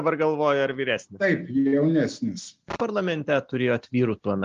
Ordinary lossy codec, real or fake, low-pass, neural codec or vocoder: Opus, 32 kbps; real; 7.2 kHz; none